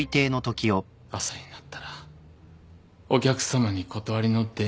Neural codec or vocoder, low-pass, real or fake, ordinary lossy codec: none; none; real; none